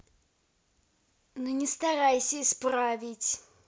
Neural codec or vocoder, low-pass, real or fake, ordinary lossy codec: none; none; real; none